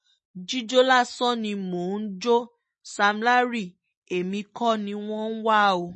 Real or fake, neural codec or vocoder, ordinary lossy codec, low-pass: real; none; MP3, 32 kbps; 10.8 kHz